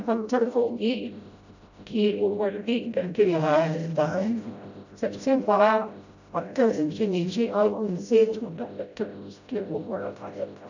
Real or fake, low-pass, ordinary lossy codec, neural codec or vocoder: fake; 7.2 kHz; none; codec, 16 kHz, 0.5 kbps, FreqCodec, smaller model